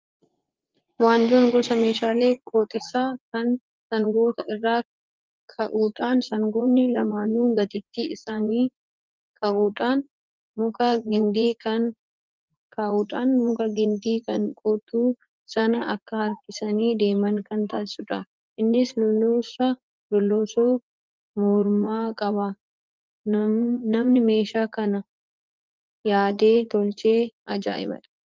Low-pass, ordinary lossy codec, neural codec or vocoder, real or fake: 7.2 kHz; Opus, 32 kbps; vocoder, 44.1 kHz, 80 mel bands, Vocos; fake